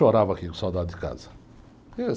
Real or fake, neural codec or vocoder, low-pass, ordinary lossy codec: real; none; none; none